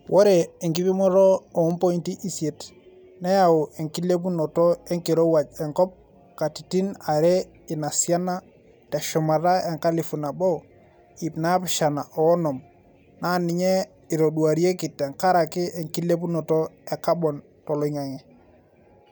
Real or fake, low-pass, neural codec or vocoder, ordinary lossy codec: real; none; none; none